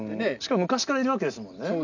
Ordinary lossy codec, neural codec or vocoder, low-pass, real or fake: none; none; 7.2 kHz; real